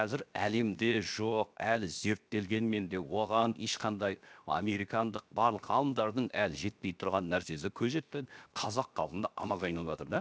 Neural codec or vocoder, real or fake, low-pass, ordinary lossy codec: codec, 16 kHz, 0.7 kbps, FocalCodec; fake; none; none